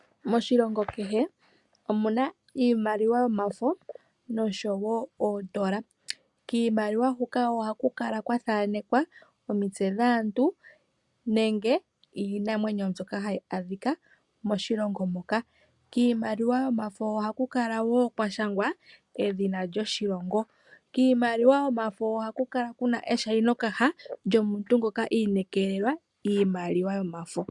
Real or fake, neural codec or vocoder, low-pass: real; none; 10.8 kHz